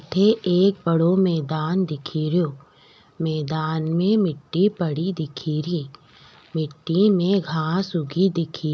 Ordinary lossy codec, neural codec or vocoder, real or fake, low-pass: none; none; real; none